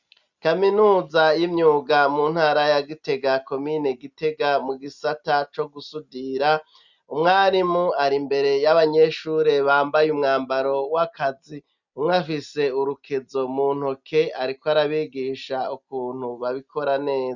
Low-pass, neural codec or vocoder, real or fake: 7.2 kHz; none; real